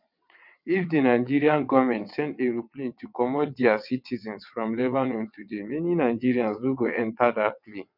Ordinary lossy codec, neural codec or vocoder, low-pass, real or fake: none; vocoder, 22.05 kHz, 80 mel bands, WaveNeXt; 5.4 kHz; fake